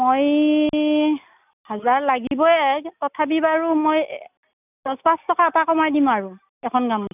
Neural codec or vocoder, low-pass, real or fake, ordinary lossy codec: none; 3.6 kHz; real; none